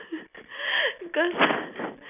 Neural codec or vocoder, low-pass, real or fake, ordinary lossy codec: none; 3.6 kHz; real; none